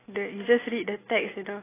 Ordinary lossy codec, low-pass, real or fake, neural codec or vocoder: AAC, 16 kbps; 3.6 kHz; real; none